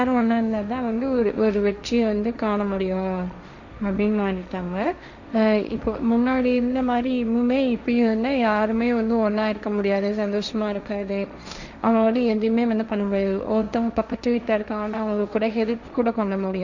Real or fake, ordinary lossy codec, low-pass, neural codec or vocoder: fake; none; 7.2 kHz; codec, 16 kHz, 1.1 kbps, Voila-Tokenizer